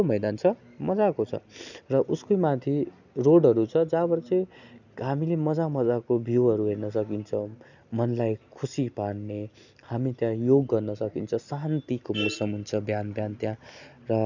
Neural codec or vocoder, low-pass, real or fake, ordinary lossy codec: none; 7.2 kHz; real; none